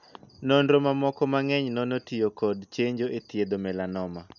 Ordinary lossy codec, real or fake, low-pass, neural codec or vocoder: none; real; 7.2 kHz; none